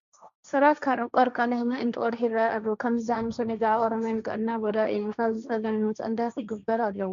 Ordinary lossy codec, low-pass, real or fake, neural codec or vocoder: AAC, 96 kbps; 7.2 kHz; fake; codec, 16 kHz, 1.1 kbps, Voila-Tokenizer